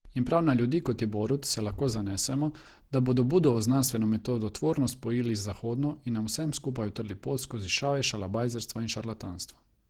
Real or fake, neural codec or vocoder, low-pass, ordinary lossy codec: fake; vocoder, 48 kHz, 128 mel bands, Vocos; 19.8 kHz; Opus, 16 kbps